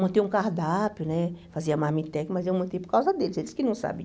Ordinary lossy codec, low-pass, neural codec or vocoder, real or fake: none; none; none; real